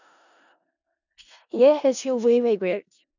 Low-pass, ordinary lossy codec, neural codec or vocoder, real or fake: 7.2 kHz; none; codec, 16 kHz in and 24 kHz out, 0.4 kbps, LongCat-Audio-Codec, four codebook decoder; fake